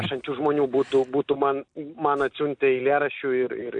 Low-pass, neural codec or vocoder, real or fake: 9.9 kHz; none; real